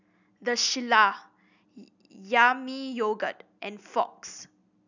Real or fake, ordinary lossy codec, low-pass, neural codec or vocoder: real; none; 7.2 kHz; none